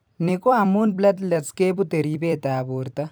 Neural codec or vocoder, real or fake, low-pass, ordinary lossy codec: vocoder, 44.1 kHz, 128 mel bands every 256 samples, BigVGAN v2; fake; none; none